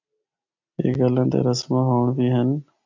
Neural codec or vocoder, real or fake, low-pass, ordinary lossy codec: none; real; 7.2 kHz; MP3, 64 kbps